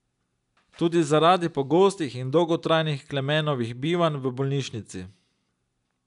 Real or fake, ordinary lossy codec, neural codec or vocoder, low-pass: real; none; none; 10.8 kHz